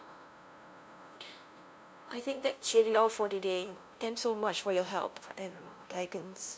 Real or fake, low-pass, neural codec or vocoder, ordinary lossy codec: fake; none; codec, 16 kHz, 0.5 kbps, FunCodec, trained on LibriTTS, 25 frames a second; none